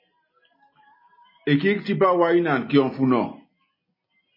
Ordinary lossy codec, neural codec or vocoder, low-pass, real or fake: MP3, 24 kbps; none; 5.4 kHz; real